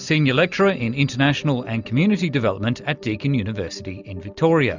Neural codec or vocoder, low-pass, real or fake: none; 7.2 kHz; real